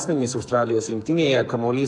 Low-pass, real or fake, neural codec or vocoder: 10.8 kHz; fake; codec, 44.1 kHz, 2.6 kbps, SNAC